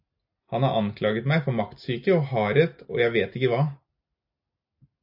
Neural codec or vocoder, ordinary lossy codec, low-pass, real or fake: none; AAC, 48 kbps; 5.4 kHz; real